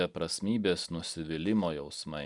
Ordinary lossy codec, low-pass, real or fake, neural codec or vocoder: Opus, 64 kbps; 10.8 kHz; real; none